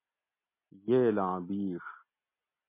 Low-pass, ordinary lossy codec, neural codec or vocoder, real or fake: 3.6 kHz; MP3, 24 kbps; none; real